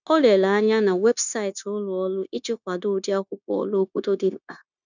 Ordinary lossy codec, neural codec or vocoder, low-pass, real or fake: none; codec, 16 kHz, 0.9 kbps, LongCat-Audio-Codec; 7.2 kHz; fake